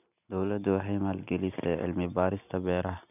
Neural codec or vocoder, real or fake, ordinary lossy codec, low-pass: none; real; none; 3.6 kHz